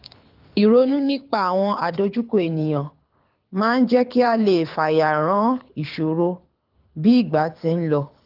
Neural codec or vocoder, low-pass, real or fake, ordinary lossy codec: codec, 24 kHz, 6 kbps, HILCodec; 5.4 kHz; fake; Opus, 32 kbps